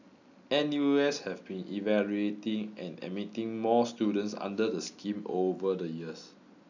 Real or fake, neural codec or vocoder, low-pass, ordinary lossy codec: real; none; 7.2 kHz; none